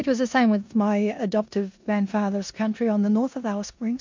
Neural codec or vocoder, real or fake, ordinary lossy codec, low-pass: codec, 16 kHz in and 24 kHz out, 0.9 kbps, LongCat-Audio-Codec, four codebook decoder; fake; MP3, 48 kbps; 7.2 kHz